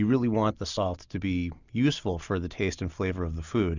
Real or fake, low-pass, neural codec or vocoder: real; 7.2 kHz; none